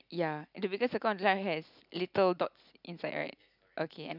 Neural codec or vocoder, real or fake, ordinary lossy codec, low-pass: none; real; none; 5.4 kHz